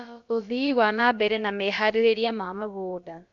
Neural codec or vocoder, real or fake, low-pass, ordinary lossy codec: codec, 16 kHz, about 1 kbps, DyCAST, with the encoder's durations; fake; 7.2 kHz; none